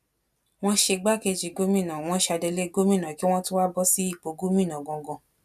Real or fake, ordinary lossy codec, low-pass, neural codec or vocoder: real; none; 14.4 kHz; none